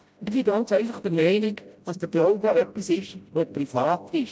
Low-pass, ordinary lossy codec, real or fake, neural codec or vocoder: none; none; fake; codec, 16 kHz, 0.5 kbps, FreqCodec, smaller model